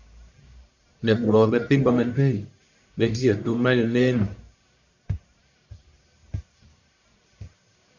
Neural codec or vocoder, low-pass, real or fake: codec, 44.1 kHz, 1.7 kbps, Pupu-Codec; 7.2 kHz; fake